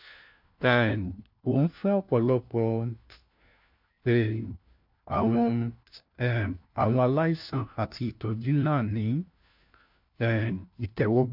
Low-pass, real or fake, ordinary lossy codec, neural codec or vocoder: 5.4 kHz; fake; MP3, 48 kbps; codec, 16 kHz, 1 kbps, FunCodec, trained on LibriTTS, 50 frames a second